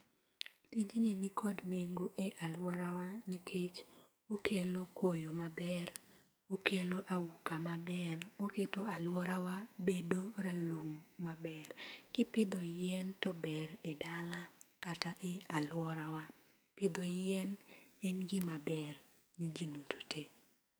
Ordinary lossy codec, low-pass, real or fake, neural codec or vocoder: none; none; fake; codec, 44.1 kHz, 2.6 kbps, SNAC